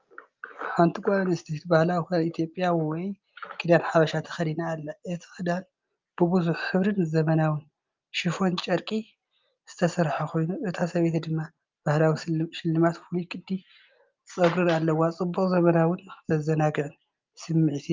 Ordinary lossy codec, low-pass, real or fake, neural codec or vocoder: Opus, 24 kbps; 7.2 kHz; real; none